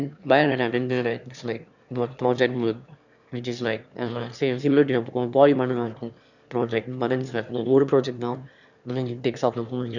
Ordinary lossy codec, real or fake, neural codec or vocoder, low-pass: none; fake; autoencoder, 22.05 kHz, a latent of 192 numbers a frame, VITS, trained on one speaker; 7.2 kHz